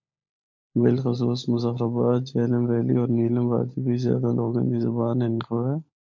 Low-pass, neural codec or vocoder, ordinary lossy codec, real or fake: 7.2 kHz; codec, 16 kHz, 16 kbps, FunCodec, trained on LibriTTS, 50 frames a second; MP3, 48 kbps; fake